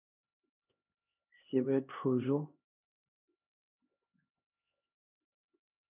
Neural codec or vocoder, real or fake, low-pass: codec, 16 kHz, 2 kbps, X-Codec, HuBERT features, trained on LibriSpeech; fake; 3.6 kHz